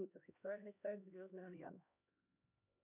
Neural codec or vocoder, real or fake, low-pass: codec, 16 kHz, 1 kbps, X-Codec, HuBERT features, trained on LibriSpeech; fake; 3.6 kHz